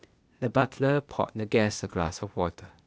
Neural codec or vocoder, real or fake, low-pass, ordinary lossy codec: codec, 16 kHz, 0.8 kbps, ZipCodec; fake; none; none